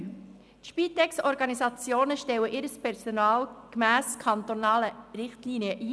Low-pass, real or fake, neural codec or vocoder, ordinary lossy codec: none; real; none; none